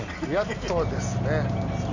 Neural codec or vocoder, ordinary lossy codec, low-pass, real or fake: none; none; 7.2 kHz; real